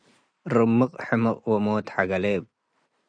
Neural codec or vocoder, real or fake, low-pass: none; real; 9.9 kHz